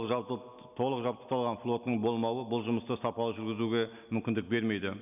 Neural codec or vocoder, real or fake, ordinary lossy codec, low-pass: none; real; none; 3.6 kHz